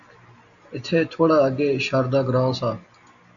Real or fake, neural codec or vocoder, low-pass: real; none; 7.2 kHz